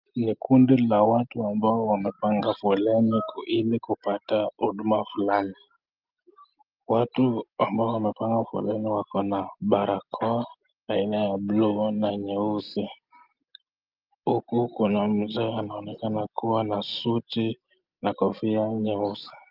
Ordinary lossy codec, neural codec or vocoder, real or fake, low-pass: Opus, 24 kbps; none; real; 5.4 kHz